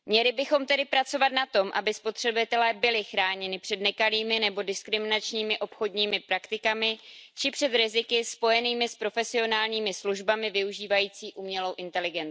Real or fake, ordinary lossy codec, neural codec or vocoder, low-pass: real; none; none; none